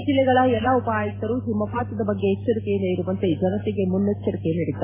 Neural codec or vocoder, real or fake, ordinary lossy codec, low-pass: none; real; AAC, 16 kbps; 3.6 kHz